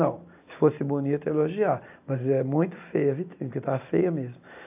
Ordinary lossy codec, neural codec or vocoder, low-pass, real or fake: AAC, 32 kbps; none; 3.6 kHz; real